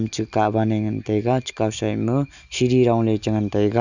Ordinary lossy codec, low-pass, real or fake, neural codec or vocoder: none; 7.2 kHz; real; none